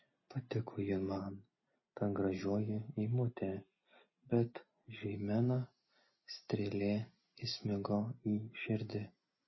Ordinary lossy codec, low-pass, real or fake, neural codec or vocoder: MP3, 24 kbps; 7.2 kHz; real; none